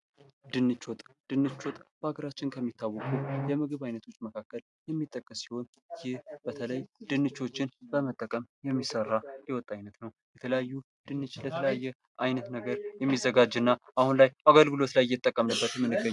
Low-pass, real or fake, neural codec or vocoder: 10.8 kHz; real; none